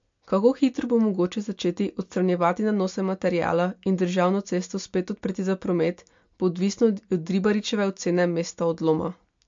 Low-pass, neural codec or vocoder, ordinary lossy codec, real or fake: 7.2 kHz; none; MP3, 48 kbps; real